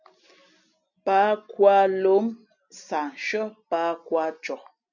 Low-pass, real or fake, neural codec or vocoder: 7.2 kHz; real; none